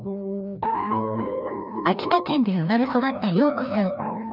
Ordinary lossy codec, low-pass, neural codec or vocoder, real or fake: none; 5.4 kHz; codec, 16 kHz, 1 kbps, FreqCodec, larger model; fake